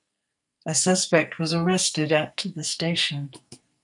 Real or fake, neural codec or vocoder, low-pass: fake; codec, 44.1 kHz, 2.6 kbps, SNAC; 10.8 kHz